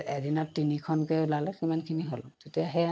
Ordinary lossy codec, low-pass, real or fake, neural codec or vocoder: none; none; real; none